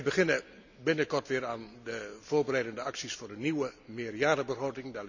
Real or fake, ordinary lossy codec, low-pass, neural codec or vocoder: real; none; 7.2 kHz; none